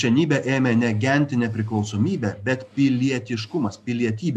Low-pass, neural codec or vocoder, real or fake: 14.4 kHz; none; real